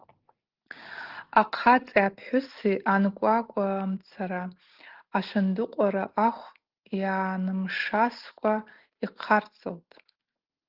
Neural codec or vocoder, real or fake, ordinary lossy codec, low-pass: none; real; Opus, 16 kbps; 5.4 kHz